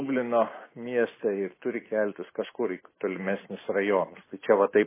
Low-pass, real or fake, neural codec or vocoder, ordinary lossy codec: 3.6 kHz; real; none; MP3, 16 kbps